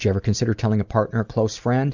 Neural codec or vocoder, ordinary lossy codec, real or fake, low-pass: none; Opus, 64 kbps; real; 7.2 kHz